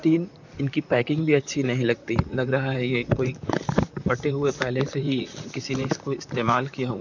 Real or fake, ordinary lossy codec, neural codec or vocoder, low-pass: fake; none; vocoder, 44.1 kHz, 128 mel bands, Pupu-Vocoder; 7.2 kHz